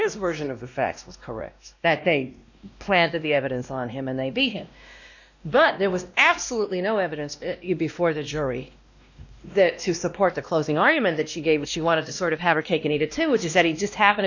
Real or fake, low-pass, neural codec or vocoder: fake; 7.2 kHz; codec, 16 kHz, 1 kbps, X-Codec, WavLM features, trained on Multilingual LibriSpeech